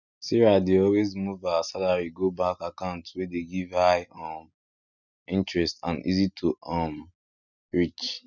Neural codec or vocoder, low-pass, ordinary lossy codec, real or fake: none; 7.2 kHz; none; real